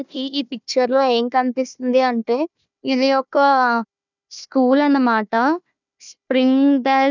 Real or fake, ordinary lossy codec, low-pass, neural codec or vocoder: fake; none; 7.2 kHz; codec, 16 kHz, 1 kbps, FunCodec, trained on Chinese and English, 50 frames a second